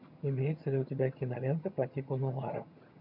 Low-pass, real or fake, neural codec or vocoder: 5.4 kHz; fake; vocoder, 22.05 kHz, 80 mel bands, HiFi-GAN